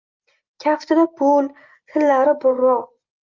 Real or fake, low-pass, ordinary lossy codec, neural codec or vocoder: real; 7.2 kHz; Opus, 32 kbps; none